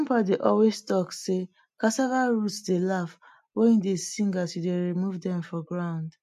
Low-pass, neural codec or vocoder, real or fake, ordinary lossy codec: 14.4 kHz; none; real; MP3, 64 kbps